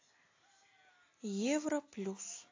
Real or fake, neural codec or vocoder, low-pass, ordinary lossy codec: real; none; 7.2 kHz; AAC, 32 kbps